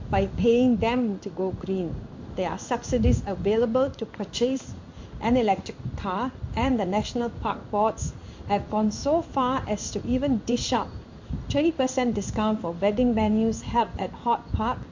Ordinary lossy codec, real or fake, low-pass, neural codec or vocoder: MP3, 48 kbps; fake; 7.2 kHz; codec, 16 kHz in and 24 kHz out, 1 kbps, XY-Tokenizer